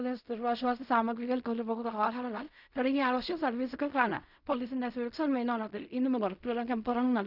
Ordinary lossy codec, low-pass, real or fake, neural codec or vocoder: none; 5.4 kHz; fake; codec, 16 kHz in and 24 kHz out, 0.4 kbps, LongCat-Audio-Codec, fine tuned four codebook decoder